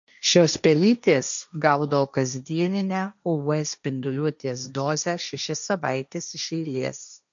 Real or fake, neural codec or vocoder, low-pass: fake; codec, 16 kHz, 1.1 kbps, Voila-Tokenizer; 7.2 kHz